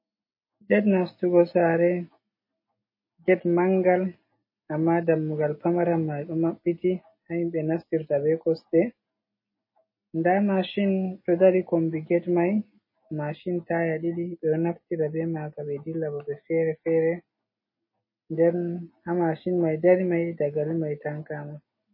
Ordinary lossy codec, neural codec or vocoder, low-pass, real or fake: MP3, 24 kbps; none; 5.4 kHz; real